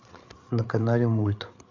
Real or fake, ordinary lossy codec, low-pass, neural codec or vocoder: fake; AAC, 48 kbps; 7.2 kHz; codec, 16 kHz, 8 kbps, FreqCodec, larger model